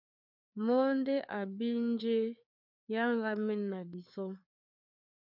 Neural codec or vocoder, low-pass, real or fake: codec, 16 kHz, 4 kbps, FreqCodec, larger model; 5.4 kHz; fake